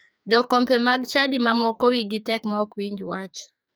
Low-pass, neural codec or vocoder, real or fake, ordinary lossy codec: none; codec, 44.1 kHz, 2.6 kbps, SNAC; fake; none